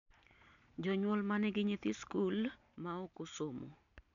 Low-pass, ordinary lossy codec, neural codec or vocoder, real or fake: 7.2 kHz; none; none; real